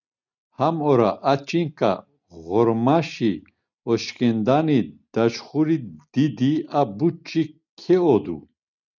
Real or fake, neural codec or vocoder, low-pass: real; none; 7.2 kHz